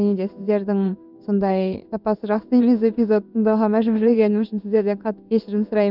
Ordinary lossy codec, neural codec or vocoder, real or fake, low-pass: none; codec, 16 kHz in and 24 kHz out, 1 kbps, XY-Tokenizer; fake; 5.4 kHz